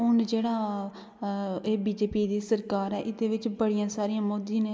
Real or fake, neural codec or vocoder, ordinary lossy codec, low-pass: real; none; none; none